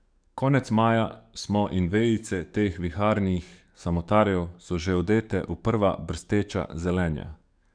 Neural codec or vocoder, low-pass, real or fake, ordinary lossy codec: codec, 44.1 kHz, 7.8 kbps, DAC; 9.9 kHz; fake; none